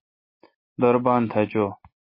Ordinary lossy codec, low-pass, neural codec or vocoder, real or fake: MP3, 24 kbps; 5.4 kHz; none; real